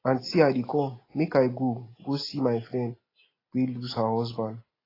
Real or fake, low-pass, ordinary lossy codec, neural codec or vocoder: real; 5.4 kHz; AAC, 24 kbps; none